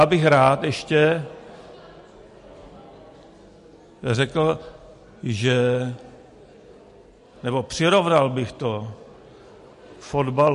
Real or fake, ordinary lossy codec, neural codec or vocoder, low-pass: real; MP3, 48 kbps; none; 14.4 kHz